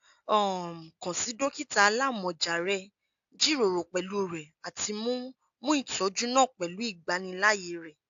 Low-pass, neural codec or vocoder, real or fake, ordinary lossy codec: 7.2 kHz; none; real; AAC, 64 kbps